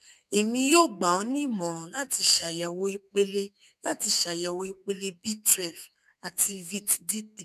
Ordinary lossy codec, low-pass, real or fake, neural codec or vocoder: none; 14.4 kHz; fake; codec, 32 kHz, 1.9 kbps, SNAC